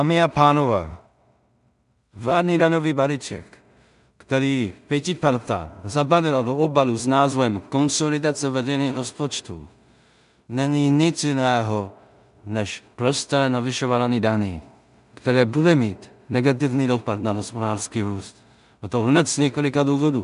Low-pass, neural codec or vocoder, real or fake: 10.8 kHz; codec, 16 kHz in and 24 kHz out, 0.4 kbps, LongCat-Audio-Codec, two codebook decoder; fake